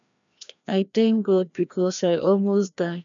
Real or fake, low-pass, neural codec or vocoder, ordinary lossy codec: fake; 7.2 kHz; codec, 16 kHz, 1 kbps, FreqCodec, larger model; none